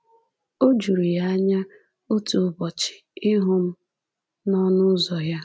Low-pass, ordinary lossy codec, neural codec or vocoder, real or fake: none; none; none; real